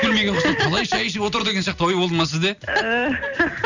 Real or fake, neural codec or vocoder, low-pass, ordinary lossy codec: real; none; 7.2 kHz; none